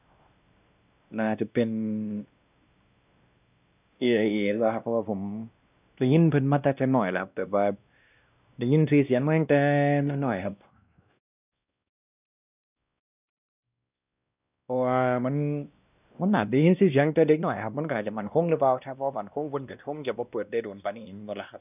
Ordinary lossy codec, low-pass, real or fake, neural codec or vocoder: none; 3.6 kHz; fake; codec, 16 kHz, 1 kbps, X-Codec, WavLM features, trained on Multilingual LibriSpeech